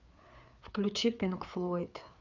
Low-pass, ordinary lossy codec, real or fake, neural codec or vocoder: 7.2 kHz; none; fake; codec, 16 kHz, 4 kbps, FreqCodec, larger model